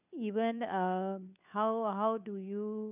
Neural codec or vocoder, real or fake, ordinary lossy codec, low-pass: codec, 16 kHz, 2 kbps, FunCodec, trained on Chinese and English, 25 frames a second; fake; none; 3.6 kHz